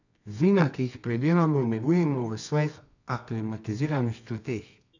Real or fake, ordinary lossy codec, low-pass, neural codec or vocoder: fake; none; 7.2 kHz; codec, 24 kHz, 0.9 kbps, WavTokenizer, medium music audio release